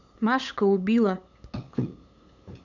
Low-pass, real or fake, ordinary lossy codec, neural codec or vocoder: 7.2 kHz; fake; none; codec, 16 kHz, 8 kbps, FunCodec, trained on LibriTTS, 25 frames a second